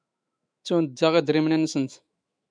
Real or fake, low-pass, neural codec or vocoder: fake; 9.9 kHz; autoencoder, 48 kHz, 128 numbers a frame, DAC-VAE, trained on Japanese speech